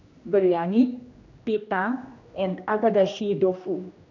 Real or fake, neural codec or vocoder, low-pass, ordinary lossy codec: fake; codec, 16 kHz, 1 kbps, X-Codec, HuBERT features, trained on general audio; 7.2 kHz; none